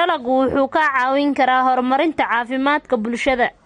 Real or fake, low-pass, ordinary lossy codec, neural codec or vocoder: real; 14.4 kHz; MP3, 48 kbps; none